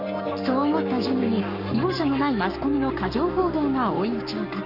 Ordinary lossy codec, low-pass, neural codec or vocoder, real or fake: none; 5.4 kHz; codec, 44.1 kHz, 7.8 kbps, Pupu-Codec; fake